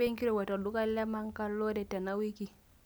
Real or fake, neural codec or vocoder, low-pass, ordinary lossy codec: real; none; none; none